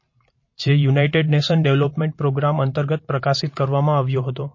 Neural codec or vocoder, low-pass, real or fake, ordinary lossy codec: vocoder, 44.1 kHz, 128 mel bands every 512 samples, BigVGAN v2; 7.2 kHz; fake; MP3, 32 kbps